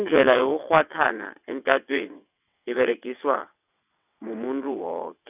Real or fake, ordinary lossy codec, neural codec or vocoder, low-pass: fake; none; vocoder, 22.05 kHz, 80 mel bands, WaveNeXt; 3.6 kHz